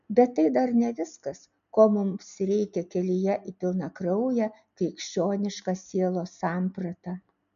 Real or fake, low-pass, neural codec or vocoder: real; 7.2 kHz; none